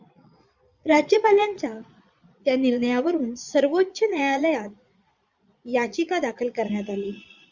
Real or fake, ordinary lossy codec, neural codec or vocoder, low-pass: fake; Opus, 64 kbps; vocoder, 22.05 kHz, 80 mel bands, Vocos; 7.2 kHz